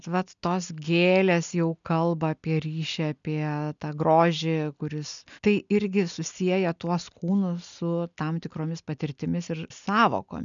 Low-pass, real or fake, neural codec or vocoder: 7.2 kHz; real; none